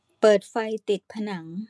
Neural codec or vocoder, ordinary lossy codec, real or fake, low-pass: vocoder, 24 kHz, 100 mel bands, Vocos; none; fake; none